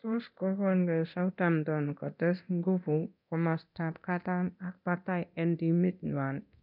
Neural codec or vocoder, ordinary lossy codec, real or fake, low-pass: codec, 24 kHz, 0.9 kbps, DualCodec; none; fake; 5.4 kHz